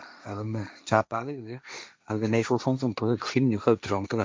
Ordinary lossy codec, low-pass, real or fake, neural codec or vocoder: none; none; fake; codec, 16 kHz, 1.1 kbps, Voila-Tokenizer